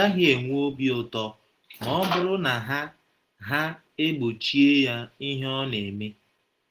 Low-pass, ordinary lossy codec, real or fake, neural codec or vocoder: 14.4 kHz; Opus, 16 kbps; real; none